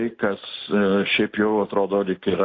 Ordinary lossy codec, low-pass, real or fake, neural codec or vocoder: AAC, 32 kbps; 7.2 kHz; real; none